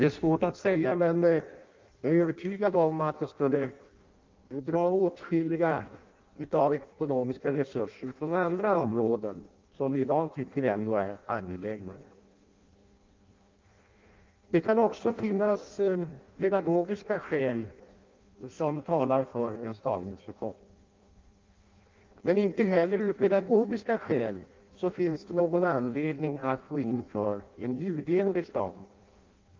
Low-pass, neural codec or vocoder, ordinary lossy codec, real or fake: 7.2 kHz; codec, 16 kHz in and 24 kHz out, 0.6 kbps, FireRedTTS-2 codec; Opus, 24 kbps; fake